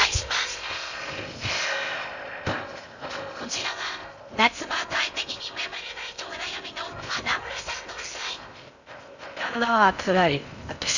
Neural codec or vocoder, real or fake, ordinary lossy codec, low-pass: codec, 16 kHz in and 24 kHz out, 0.6 kbps, FocalCodec, streaming, 2048 codes; fake; none; 7.2 kHz